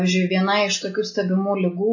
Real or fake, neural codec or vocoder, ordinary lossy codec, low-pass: real; none; MP3, 32 kbps; 7.2 kHz